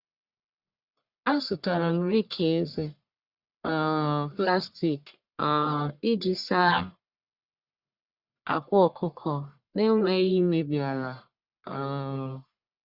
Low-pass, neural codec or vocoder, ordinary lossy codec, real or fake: 5.4 kHz; codec, 44.1 kHz, 1.7 kbps, Pupu-Codec; Opus, 64 kbps; fake